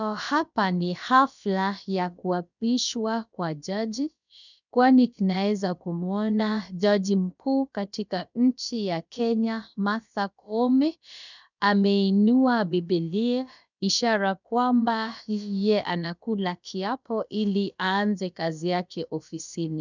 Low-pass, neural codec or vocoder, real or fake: 7.2 kHz; codec, 16 kHz, about 1 kbps, DyCAST, with the encoder's durations; fake